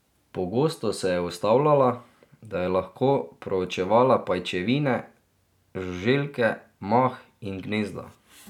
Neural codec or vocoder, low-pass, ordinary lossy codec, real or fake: none; 19.8 kHz; none; real